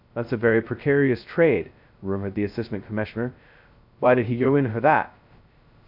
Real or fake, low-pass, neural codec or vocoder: fake; 5.4 kHz; codec, 16 kHz, 0.2 kbps, FocalCodec